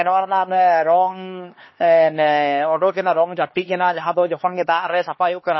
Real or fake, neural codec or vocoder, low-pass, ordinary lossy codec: fake; codec, 16 kHz, 2 kbps, X-Codec, HuBERT features, trained on LibriSpeech; 7.2 kHz; MP3, 24 kbps